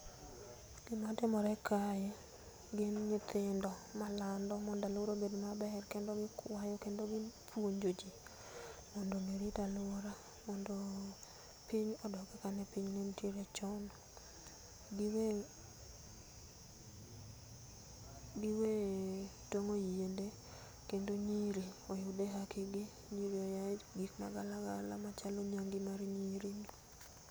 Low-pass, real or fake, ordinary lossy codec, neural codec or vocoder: none; real; none; none